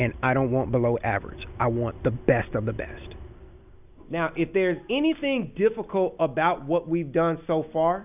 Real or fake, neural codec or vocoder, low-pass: real; none; 3.6 kHz